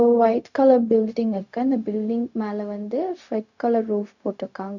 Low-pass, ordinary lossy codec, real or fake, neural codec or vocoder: 7.2 kHz; none; fake; codec, 16 kHz, 0.4 kbps, LongCat-Audio-Codec